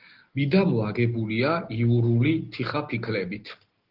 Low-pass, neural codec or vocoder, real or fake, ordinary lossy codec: 5.4 kHz; none; real; Opus, 16 kbps